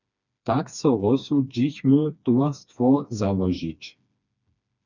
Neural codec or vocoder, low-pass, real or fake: codec, 16 kHz, 2 kbps, FreqCodec, smaller model; 7.2 kHz; fake